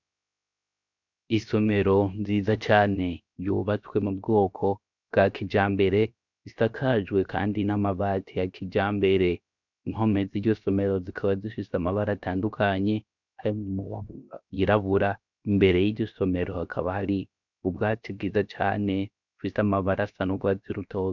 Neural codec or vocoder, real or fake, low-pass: codec, 16 kHz, 0.7 kbps, FocalCodec; fake; 7.2 kHz